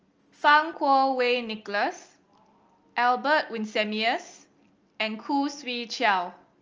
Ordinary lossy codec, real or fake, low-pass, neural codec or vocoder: Opus, 24 kbps; real; 7.2 kHz; none